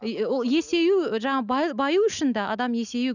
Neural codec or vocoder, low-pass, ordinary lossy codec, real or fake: none; 7.2 kHz; none; real